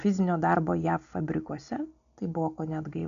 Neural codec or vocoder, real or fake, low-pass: none; real; 7.2 kHz